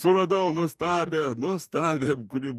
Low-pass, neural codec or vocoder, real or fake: 14.4 kHz; codec, 44.1 kHz, 2.6 kbps, DAC; fake